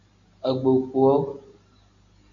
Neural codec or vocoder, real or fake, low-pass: none; real; 7.2 kHz